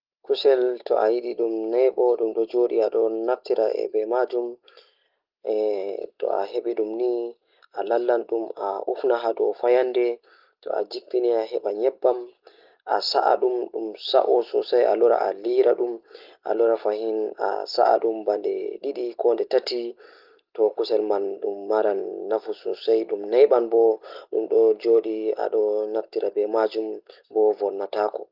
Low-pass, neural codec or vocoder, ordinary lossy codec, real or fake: 5.4 kHz; none; Opus, 32 kbps; real